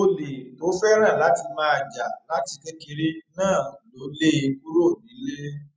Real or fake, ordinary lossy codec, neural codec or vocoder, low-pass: real; none; none; none